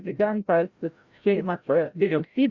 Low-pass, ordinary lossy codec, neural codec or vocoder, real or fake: 7.2 kHz; Opus, 64 kbps; codec, 16 kHz, 0.5 kbps, FreqCodec, larger model; fake